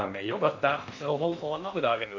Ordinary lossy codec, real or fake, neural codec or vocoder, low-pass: AAC, 48 kbps; fake; codec, 16 kHz in and 24 kHz out, 0.8 kbps, FocalCodec, streaming, 65536 codes; 7.2 kHz